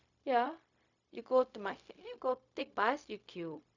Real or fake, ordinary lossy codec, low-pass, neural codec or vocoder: fake; none; 7.2 kHz; codec, 16 kHz, 0.4 kbps, LongCat-Audio-Codec